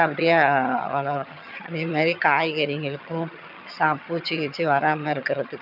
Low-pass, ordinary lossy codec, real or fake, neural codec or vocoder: 5.4 kHz; none; fake; vocoder, 22.05 kHz, 80 mel bands, HiFi-GAN